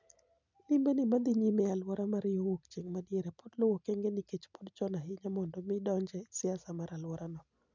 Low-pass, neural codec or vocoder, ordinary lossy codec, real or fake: 7.2 kHz; none; none; real